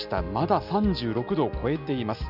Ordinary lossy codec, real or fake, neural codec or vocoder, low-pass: none; real; none; 5.4 kHz